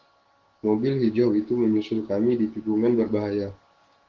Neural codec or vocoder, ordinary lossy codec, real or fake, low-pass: codec, 16 kHz, 6 kbps, DAC; Opus, 16 kbps; fake; 7.2 kHz